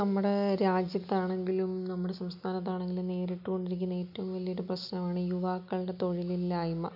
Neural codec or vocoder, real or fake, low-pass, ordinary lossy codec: none; real; 5.4 kHz; none